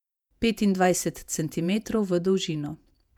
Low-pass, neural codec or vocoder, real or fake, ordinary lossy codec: 19.8 kHz; vocoder, 48 kHz, 128 mel bands, Vocos; fake; none